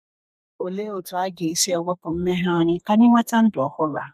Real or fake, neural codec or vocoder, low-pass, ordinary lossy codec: fake; codec, 32 kHz, 1.9 kbps, SNAC; 14.4 kHz; none